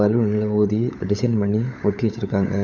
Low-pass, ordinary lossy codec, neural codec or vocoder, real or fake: 7.2 kHz; none; codec, 16 kHz, 16 kbps, FunCodec, trained on LibriTTS, 50 frames a second; fake